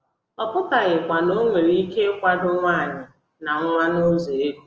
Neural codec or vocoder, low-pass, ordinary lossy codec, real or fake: none; 7.2 kHz; Opus, 24 kbps; real